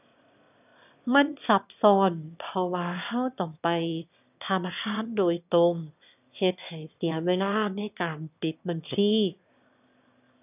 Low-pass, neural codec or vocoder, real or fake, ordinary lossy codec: 3.6 kHz; autoencoder, 22.05 kHz, a latent of 192 numbers a frame, VITS, trained on one speaker; fake; none